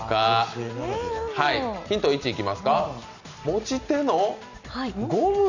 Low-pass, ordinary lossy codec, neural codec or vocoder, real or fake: 7.2 kHz; none; none; real